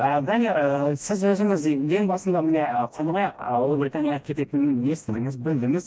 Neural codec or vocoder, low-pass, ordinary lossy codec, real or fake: codec, 16 kHz, 1 kbps, FreqCodec, smaller model; none; none; fake